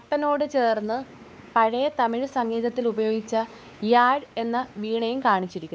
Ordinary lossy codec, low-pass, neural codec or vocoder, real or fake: none; none; codec, 16 kHz, 4 kbps, X-Codec, WavLM features, trained on Multilingual LibriSpeech; fake